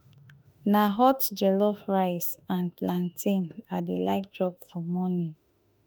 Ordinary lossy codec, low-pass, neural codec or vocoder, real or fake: none; none; autoencoder, 48 kHz, 32 numbers a frame, DAC-VAE, trained on Japanese speech; fake